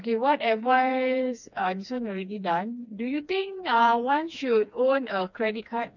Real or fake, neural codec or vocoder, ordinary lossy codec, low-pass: fake; codec, 16 kHz, 2 kbps, FreqCodec, smaller model; none; 7.2 kHz